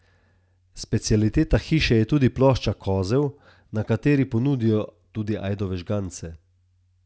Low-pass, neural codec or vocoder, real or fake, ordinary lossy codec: none; none; real; none